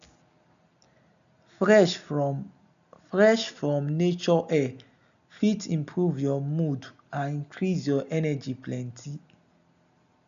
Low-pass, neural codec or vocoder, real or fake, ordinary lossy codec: 7.2 kHz; none; real; none